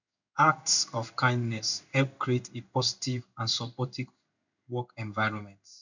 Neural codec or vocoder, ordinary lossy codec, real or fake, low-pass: codec, 16 kHz in and 24 kHz out, 1 kbps, XY-Tokenizer; none; fake; 7.2 kHz